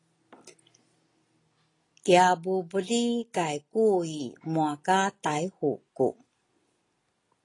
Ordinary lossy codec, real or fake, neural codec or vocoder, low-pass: AAC, 32 kbps; real; none; 10.8 kHz